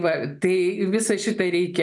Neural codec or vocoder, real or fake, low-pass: none; real; 10.8 kHz